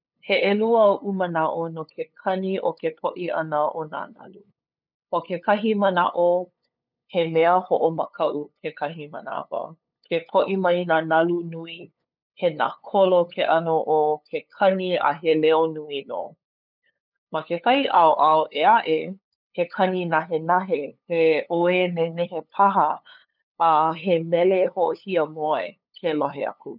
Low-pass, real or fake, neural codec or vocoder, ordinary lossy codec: 5.4 kHz; fake; codec, 16 kHz, 8 kbps, FunCodec, trained on LibriTTS, 25 frames a second; none